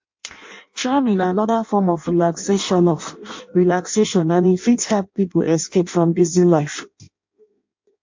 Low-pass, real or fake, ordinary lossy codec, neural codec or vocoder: 7.2 kHz; fake; MP3, 48 kbps; codec, 16 kHz in and 24 kHz out, 0.6 kbps, FireRedTTS-2 codec